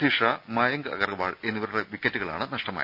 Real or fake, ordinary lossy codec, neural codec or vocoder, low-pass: real; none; none; 5.4 kHz